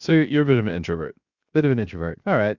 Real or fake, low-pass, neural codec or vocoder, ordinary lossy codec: fake; 7.2 kHz; codec, 16 kHz, 0.7 kbps, FocalCodec; Opus, 64 kbps